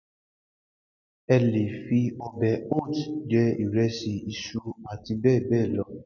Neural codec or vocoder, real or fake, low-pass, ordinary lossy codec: none; real; 7.2 kHz; none